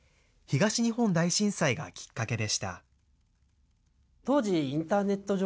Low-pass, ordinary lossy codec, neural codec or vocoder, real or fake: none; none; none; real